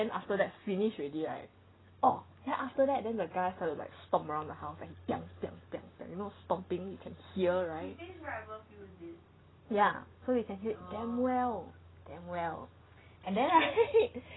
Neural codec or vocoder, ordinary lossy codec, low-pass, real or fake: none; AAC, 16 kbps; 7.2 kHz; real